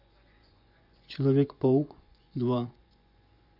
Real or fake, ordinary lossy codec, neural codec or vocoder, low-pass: fake; MP3, 48 kbps; vocoder, 24 kHz, 100 mel bands, Vocos; 5.4 kHz